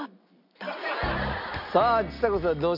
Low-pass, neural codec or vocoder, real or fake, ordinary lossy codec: 5.4 kHz; none; real; none